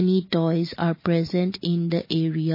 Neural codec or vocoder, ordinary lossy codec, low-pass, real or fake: none; MP3, 24 kbps; 5.4 kHz; real